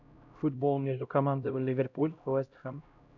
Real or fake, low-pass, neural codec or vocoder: fake; 7.2 kHz; codec, 16 kHz, 0.5 kbps, X-Codec, HuBERT features, trained on LibriSpeech